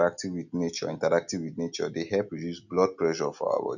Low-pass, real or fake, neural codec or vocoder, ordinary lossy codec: 7.2 kHz; real; none; none